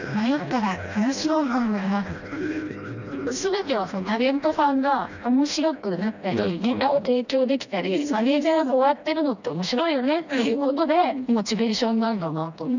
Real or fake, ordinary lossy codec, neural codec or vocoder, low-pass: fake; none; codec, 16 kHz, 1 kbps, FreqCodec, smaller model; 7.2 kHz